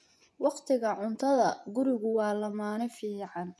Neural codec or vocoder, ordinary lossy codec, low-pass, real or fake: vocoder, 24 kHz, 100 mel bands, Vocos; none; none; fake